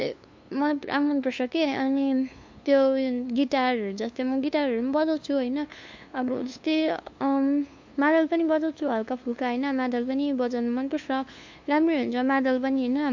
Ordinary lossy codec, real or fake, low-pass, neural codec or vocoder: MP3, 48 kbps; fake; 7.2 kHz; codec, 16 kHz, 2 kbps, FunCodec, trained on LibriTTS, 25 frames a second